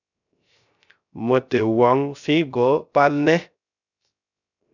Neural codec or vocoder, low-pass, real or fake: codec, 16 kHz, 0.3 kbps, FocalCodec; 7.2 kHz; fake